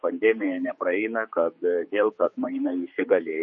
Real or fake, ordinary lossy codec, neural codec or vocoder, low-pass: fake; MP3, 32 kbps; codec, 16 kHz, 4 kbps, X-Codec, HuBERT features, trained on general audio; 7.2 kHz